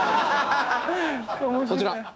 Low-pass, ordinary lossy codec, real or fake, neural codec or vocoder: none; none; fake; codec, 16 kHz, 6 kbps, DAC